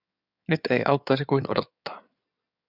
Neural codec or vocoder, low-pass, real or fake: codec, 16 kHz in and 24 kHz out, 2.2 kbps, FireRedTTS-2 codec; 5.4 kHz; fake